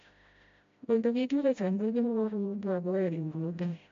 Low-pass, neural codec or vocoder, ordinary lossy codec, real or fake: 7.2 kHz; codec, 16 kHz, 0.5 kbps, FreqCodec, smaller model; MP3, 48 kbps; fake